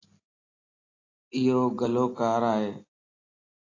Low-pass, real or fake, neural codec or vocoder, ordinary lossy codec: 7.2 kHz; real; none; AAC, 32 kbps